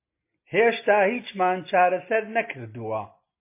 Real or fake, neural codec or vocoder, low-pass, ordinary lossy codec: real; none; 3.6 kHz; MP3, 24 kbps